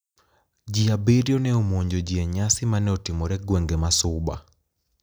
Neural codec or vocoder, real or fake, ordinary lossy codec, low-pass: none; real; none; none